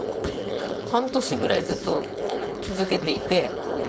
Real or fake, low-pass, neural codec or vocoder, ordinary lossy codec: fake; none; codec, 16 kHz, 4.8 kbps, FACodec; none